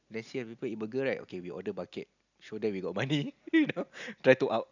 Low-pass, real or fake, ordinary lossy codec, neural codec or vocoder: 7.2 kHz; real; none; none